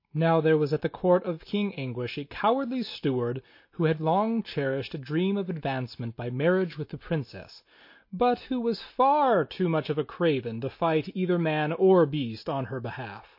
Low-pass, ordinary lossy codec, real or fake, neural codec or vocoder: 5.4 kHz; MP3, 32 kbps; real; none